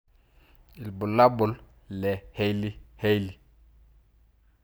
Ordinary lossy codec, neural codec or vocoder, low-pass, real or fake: none; none; none; real